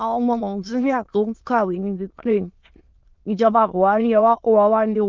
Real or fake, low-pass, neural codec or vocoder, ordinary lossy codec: fake; 7.2 kHz; autoencoder, 22.05 kHz, a latent of 192 numbers a frame, VITS, trained on many speakers; Opus, 32 kbps